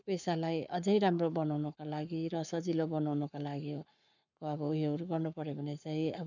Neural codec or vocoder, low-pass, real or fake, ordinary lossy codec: codec, 44.1 kHz, 7.8 kbps, Pupu-Codec; 7.2 kHz; fake; none